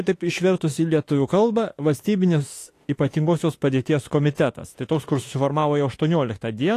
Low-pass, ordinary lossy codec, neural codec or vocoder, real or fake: 14.4 kHz; AAC, 48 kbps; autoencoder, 48 kHz, 32 numbers a frame, DAC-VAE, trained on Japanese speech; fake